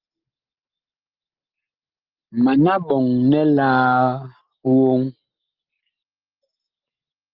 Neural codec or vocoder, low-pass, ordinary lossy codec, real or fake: none; 5.4 kHz; Opus, 24 kbps; real